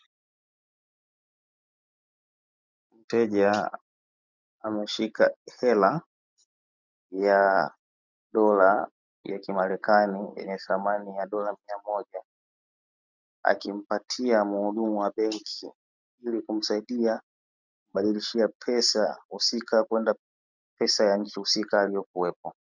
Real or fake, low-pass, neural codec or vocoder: real; 7.2 kHz; none